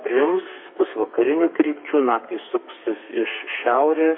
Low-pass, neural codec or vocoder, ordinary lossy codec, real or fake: 5.4 kHz; codec, 32 kHz, 1.9 kbps, SNAC; MP3, 24 kbps; fake